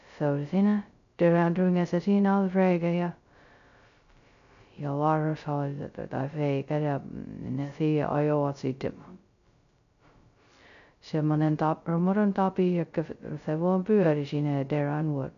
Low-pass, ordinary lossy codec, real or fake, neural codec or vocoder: 7.2 kHz; none; fake; codec, 16 kHz, 0.2 kbps, FocalCodec